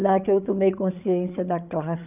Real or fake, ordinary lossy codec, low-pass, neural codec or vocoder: fake; none; 3.6 kHz; codec, 16 kHz, 8 kbps, FreqCodec, larger model